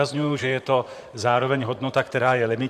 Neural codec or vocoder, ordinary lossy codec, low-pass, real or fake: vocoder, 44.1 kHz, 128 mel bands, Pupu-Vocoder; MP3, 96 kbps; 14.4 kHz; fake